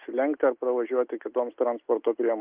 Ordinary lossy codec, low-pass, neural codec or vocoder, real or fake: Opus, 32 kbps; 3.6 kHz; none; real